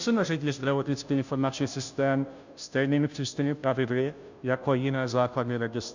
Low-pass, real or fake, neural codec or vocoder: 7.2 kHz; fake; codec, 16 kHz, 0.5 kbps, FunCodec, trained on Chinese and English, 25 frames a second